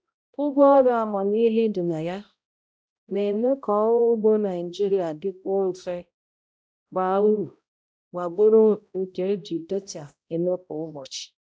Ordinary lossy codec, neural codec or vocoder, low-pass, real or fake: none; codec, 16 kHz, 0.5 kbps, X-Codec, HuBERT features, trained on balanced general audio; none; fake